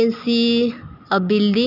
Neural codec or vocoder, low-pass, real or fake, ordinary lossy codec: none; 5.4 kHz; real; none